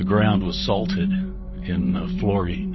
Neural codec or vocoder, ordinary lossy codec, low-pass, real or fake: vocoder, 44.1 kHz, 128 mel bands every 512 samples, BigVGAN v2; MP3, 24 kbps; 7.2 kHz; fake